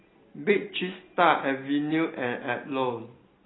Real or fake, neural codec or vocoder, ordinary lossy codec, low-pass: real; none; AAC, 16 kbps; 7.2 kHz